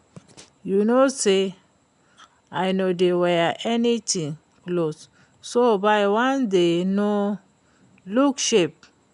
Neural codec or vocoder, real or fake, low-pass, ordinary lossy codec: none; real; 10.8 kHz; none